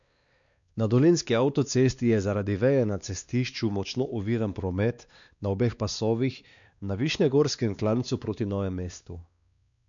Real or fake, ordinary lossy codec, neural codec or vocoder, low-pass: fake; none; codec, 16 kHz, 2 kbps, X-Codec, WavLM features, trained on Multilingual LibriSpeech; 7.2 kHz